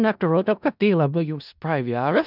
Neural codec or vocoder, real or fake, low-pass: codec, 16 kHz in and 24 kHz out, 0.4 kbps, LongCat-Audio-Codec, four codebook decoder; fake; 5.4 kHz